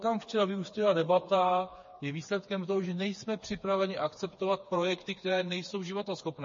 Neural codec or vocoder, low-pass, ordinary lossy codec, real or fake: codec, 16 kHz, 4 kbps, FreqCodec, smaller model; 7.2 kHz; MP3, 32 kbps; fake